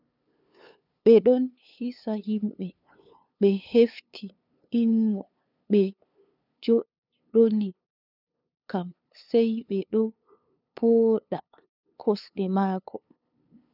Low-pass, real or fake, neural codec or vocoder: 5.4 kHz; fake; codec, 16 kHz, 2 kbps, FunCodec, trained on LibriTTS, 25 frames a second